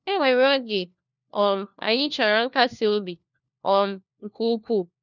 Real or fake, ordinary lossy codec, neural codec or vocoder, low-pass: fake; none; codec, 16 kHz, 1 kbps, FunCodec, trained on LibriTTS, 50 frames a second; 7.2 kHz